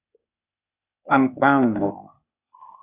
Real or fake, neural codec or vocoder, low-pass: fake; codec, 16 kHz, 0.8 kbps, ZipCodec; 3.6 kHz